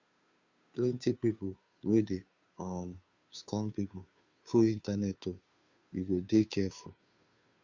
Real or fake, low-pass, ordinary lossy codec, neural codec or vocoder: fake; 7.2 kHz; Opus, 64 kbps; codec, 16 kHz, 2 kbps, FunCodec, trained on Chinese and English, 25 frames a second